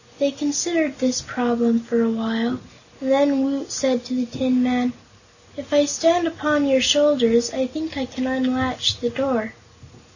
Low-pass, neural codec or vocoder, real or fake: 7.2 kHz; none; real